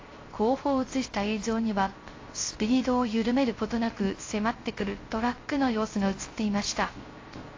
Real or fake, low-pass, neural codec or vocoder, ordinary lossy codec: fake; 7.2 kHz; codec, 16 kHz, 0.3 kbps, FocalCodec; AAC, 32 kbps